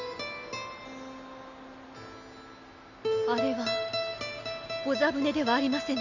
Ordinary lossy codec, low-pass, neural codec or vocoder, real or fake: none; 7.2 kHz; none; real